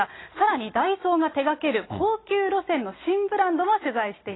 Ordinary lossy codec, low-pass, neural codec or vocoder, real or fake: AAC, 16 kbps; 7.2 kHz; none; real